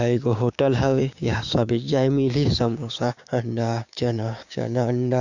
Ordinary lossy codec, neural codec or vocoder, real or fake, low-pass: none; codec, 16 kHz, 6 kbps, DAC; fake; 7.2 kHz